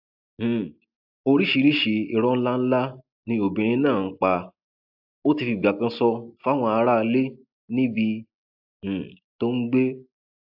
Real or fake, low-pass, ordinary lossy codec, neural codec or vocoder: real; 5.4 kHz; AAC, 48 kbps; none